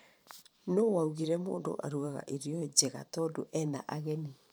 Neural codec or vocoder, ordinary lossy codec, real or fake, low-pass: vocoder, 44.1 kHz, 128 mel bands every 512 samples, BigVGAN v2; none; fake; none